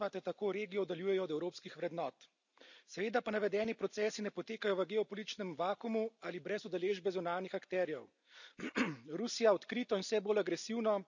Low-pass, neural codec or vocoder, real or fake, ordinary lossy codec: 7.2 kHz; none; real; none